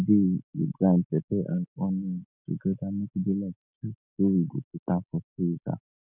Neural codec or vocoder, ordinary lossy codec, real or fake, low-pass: none; none; real; 3.6 kHz